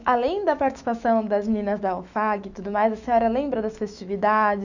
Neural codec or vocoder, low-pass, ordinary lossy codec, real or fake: autoencoder, 48 kHz, 128 numbers a frame, DAC-VAE, trained on Japanese speech; 7.2 kHz; none; fake